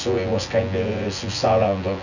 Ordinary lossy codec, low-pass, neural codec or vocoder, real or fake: none; 7.2 kHz; vocoder, 24 kHz, 100 mel bands, Vocos; fake